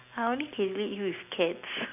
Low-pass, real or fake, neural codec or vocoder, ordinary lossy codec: 3.6 kHz; real; none; none